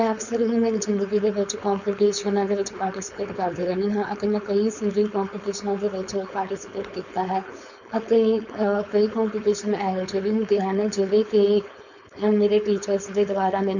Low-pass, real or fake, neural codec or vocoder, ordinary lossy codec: 7.2 kHz; fake; codec, 16 kHz, 4.8 kbps, FACodec; none